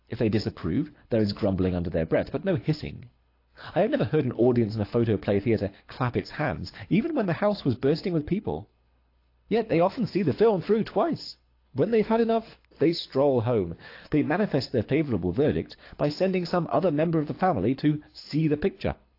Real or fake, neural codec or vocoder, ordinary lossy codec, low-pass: fake; codec, 24 kHz, 6 kbps, HILCodec; AAC, 32 kbps; 5.4 kHz